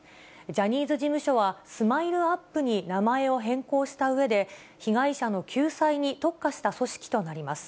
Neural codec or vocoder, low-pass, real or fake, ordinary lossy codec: none; none; real; none